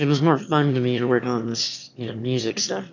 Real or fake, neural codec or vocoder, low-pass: fake; autoencoder, 22.05 kHz, a latent of 192 numbers a frame, VITS, trained on one speaker; 7.2 kHz